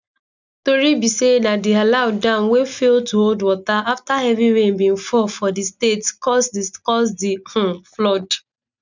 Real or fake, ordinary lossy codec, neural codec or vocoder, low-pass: real; none; none; 7.2 kHz